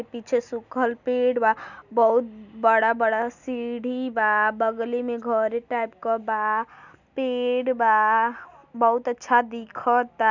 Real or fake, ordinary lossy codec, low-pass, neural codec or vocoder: real; none; 7.2 kHz; none